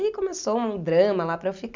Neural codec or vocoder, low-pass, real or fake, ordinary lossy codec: none; 7.2 kHz; real; MP3, 64 kbps